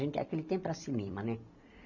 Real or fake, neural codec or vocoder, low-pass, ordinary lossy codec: real; none; 7.2 kHz; MP3, 64 kbps